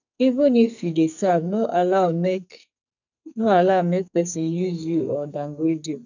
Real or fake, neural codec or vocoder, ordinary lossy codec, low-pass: fake; codec, 44.1 kHz, 2.6 kbps, SNAC; none; 7.2 kHz